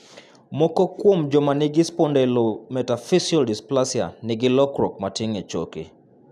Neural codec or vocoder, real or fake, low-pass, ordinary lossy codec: none; real; 14.4 kHz; none